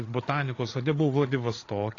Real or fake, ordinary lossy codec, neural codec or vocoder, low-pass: fake; AAC, 32 kbps; codec, 16 kHz, 8 kbps, FreqCodec, larger model; 7.2 kHz